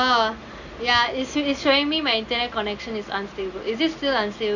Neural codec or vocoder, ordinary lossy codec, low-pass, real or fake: none; none; 7.2 kHz; real